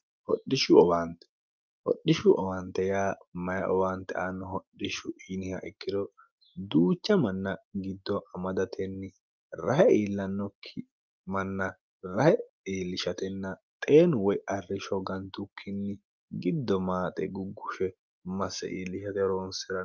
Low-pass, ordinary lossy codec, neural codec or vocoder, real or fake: 7.2 kHz; Opus, 24 kbps; none; real